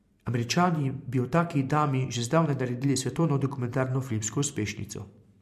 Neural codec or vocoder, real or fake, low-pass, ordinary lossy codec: vocoder, 44.1 kHz, 128 mel bands every 512 samples, BigVGAN v2; fake; 14.4 kHz; MP3, 64 kbps